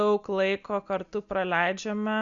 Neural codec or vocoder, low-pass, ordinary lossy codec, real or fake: none; 7.2 kHz; Opus, 64 kbps; real